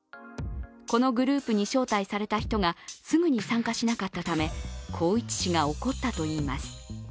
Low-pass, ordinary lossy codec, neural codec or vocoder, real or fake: none; none; none; real